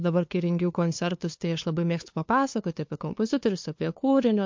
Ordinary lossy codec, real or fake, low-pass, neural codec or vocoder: MP3, 48 kbps; fake; 7.2 kHz; codec, 16 kHz, 2 kbps, FunCodec, trained on LibriTTS, 25 frames a second